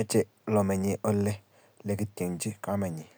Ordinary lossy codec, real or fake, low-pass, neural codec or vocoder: none; real; none; none